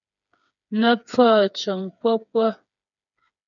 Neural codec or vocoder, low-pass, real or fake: codec, 16 kHz, 4 kbps, FreqCodec, smaller model; 7.2 kHz; fake